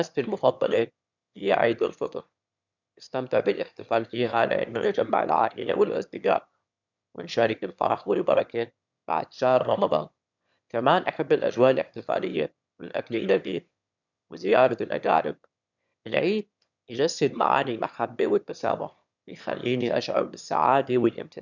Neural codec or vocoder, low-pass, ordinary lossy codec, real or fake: autoencoder, 22.05 kHz, a latent of 192 numbers a frame, VITS, trained on one speaker; 7.2 kHz; none; fake